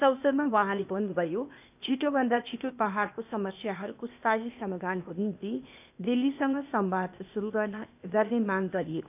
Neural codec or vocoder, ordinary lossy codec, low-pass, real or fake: codec, 16 kHz, 0.8 kbps, ZipCodec; none; 3.6 kHz; fake